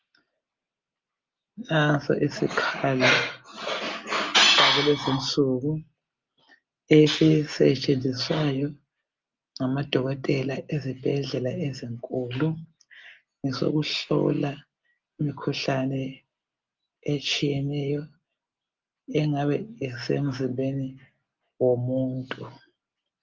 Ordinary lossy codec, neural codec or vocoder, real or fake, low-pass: Opus, 32 kbps; none; real; 7.2 kHz